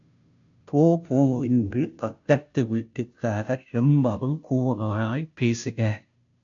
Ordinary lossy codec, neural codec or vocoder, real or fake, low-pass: MP3, 64 kbps; codec, 16 kHz, 0.5 kbps, FunCodec, trained on Chinese and English, 25 frames a second; fake; 7.2 kHz